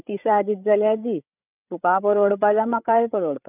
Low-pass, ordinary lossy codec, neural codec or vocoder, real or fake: 3.6 kHz; none; codec, 16 kHz, 8 kbps, FreqCodec, larger model; fake